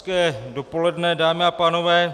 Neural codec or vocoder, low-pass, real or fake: none; 14.4 kHz; real